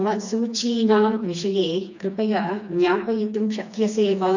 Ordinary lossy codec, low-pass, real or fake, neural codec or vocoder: none; 7.2 kHz; fake; codec, 16 kHz, 2 kbps, FreqCodec, smaller model